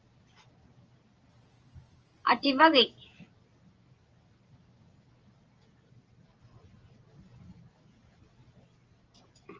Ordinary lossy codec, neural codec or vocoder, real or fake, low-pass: Opus, 24 kbps; none; real; 7.2 kHz